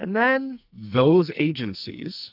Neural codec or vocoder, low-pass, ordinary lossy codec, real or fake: codec, 44.1 kHz, 2.6 kbps, SNAC; 5.4 kHz; AAC, 48 kbps; fake